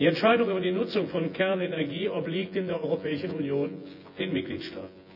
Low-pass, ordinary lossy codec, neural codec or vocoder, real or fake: 5.4 kHz; none; vocoder, 24 kHz, 100 mel bands, Vocos; fake